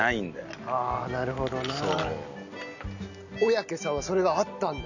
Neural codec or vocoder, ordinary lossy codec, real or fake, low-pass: none; none; real; 7.2 kHz